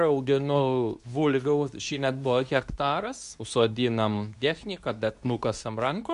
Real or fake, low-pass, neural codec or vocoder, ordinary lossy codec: fake; 10.8 kHz; codec, 24 kHz, 0.9 kbps, WavTokenizer, medium speech release version 2; AAC, 96 kbps